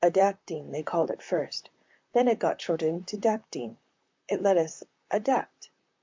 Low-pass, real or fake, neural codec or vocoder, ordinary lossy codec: 7.2 kHz; fake; vocoder, 44.1 kHz, 128 mel bands, Pupu-Vocoder; MP3, 64 kbps